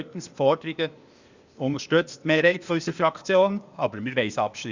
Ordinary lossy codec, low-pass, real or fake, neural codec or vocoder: Opus, 64 kbps; 7.2 kHz; fake; codec, 16 kHz, 0.8 kbps, ZipCodec